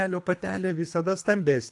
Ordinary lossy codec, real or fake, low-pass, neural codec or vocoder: AAC, 64 kbps; fake; 10.8 kHz; codec, 24 kHz, 3 kbps, HILCodec